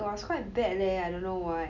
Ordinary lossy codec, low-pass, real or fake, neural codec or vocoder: none; 7.2 kHz; real; none